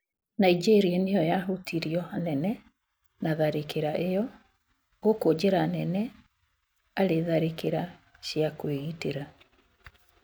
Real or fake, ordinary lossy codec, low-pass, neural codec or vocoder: real; none; none; none